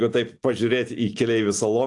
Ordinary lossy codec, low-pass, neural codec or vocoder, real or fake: AAC, 64 kbps; 10.8 kHz; none; real